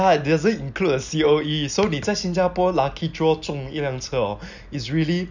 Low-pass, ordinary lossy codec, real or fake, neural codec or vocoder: 7.2 kHz; none; real; none